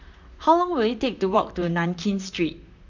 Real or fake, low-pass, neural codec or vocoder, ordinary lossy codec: fake; 7.2 kHz; vocoder, 44.1 kHz, 128 mel bands, Pupu-Vocoder; none